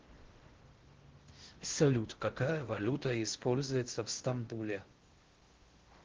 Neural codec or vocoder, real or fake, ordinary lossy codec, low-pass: codec, 16 kHz in and 24 kHz out, 0.6 kbps, FocalCodec, streaming, 4096 codes; fake; Opus, 16 kbps; 7.2 kHz